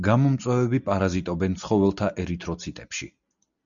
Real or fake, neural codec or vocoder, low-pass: real; none; 7.2 kHz